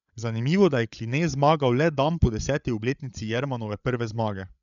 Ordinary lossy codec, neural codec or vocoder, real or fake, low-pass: none; codec, 16 kHz, 8 kbps, FreqCodec, larger model; fake; 7.2 kHz